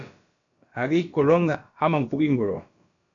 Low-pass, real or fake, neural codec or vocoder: 7.2 kHz; fake; codec, 16 kHz, about 1 kbps, DyCAST, with the encoder's durations